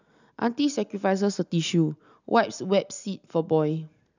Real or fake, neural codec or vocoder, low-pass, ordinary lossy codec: real; none; 7.2 kHz; none